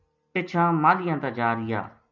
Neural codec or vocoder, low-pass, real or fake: none; 7.2 kHz; real